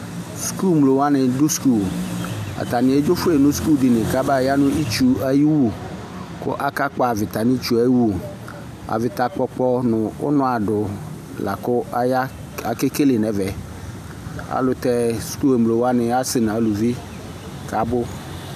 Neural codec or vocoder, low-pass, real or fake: none; 14.4 kHz; real